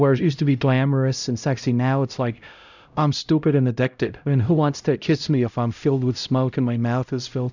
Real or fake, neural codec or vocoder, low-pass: fake; codec, 16 kHz, 0.5 kbps, X-Codec, HuBERT features, trained on LibriSpeech; 7.2 kHz